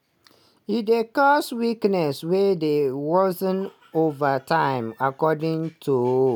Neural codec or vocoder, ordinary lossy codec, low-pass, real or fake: none; none; none; real